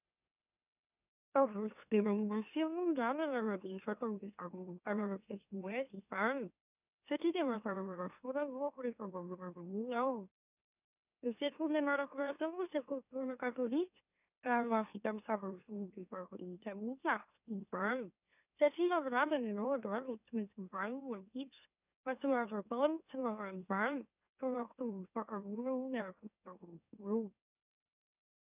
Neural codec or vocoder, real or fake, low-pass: autoencoder, 44.1 kHz, a latent of 192 numbers a frame, MeloTTS; fake; 3.6 kHz